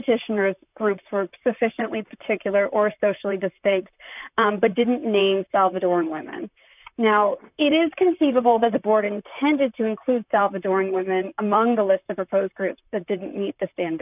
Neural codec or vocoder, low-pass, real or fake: vocoder, 44.1 kHz, 128 mel bands, Pupu-Vocoder; 3.6 kHz; fake